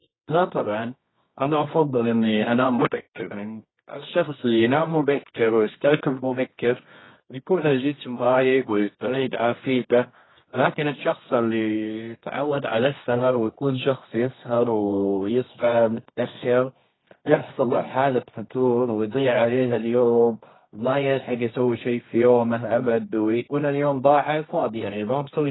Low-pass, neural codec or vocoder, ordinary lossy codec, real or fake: 7.2 kHz; codec, 24 kHz, 0.9 kbps, WavTokenizer, medium music audio release; AAC, 16 kbps; fake